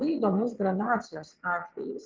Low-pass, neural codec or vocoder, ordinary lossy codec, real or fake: 7.2 kHz; vocoder, 22.05 kHz, 80 mel bands, WaveNeXt; Opus, 24 kbps; fake